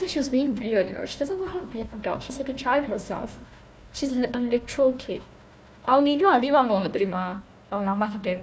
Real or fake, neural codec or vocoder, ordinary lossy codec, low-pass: fake; codec, 16 kHz, 1 kbps, FunCodec, trained on Chinese and English, 50 frames a second; none; none